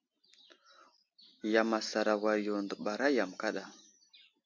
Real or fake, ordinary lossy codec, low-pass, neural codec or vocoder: real; MP3, 48 kbps; 7.2 kHz; none